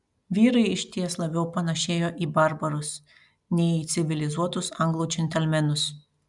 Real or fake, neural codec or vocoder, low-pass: real; none; 10.8 kHz